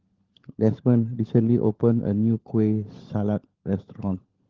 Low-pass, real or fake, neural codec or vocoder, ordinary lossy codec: 7.2 kHz; fake; codec, 16 kHz, 16 kbps, FunCodec, trained on LibriTTS, 50 frames a second; Opus, 16 kbps